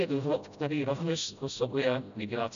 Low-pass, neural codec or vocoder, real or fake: 7.2 kHz; codec, 16 kHz, 0.5 kbps, FreqCodec, smaller model; fake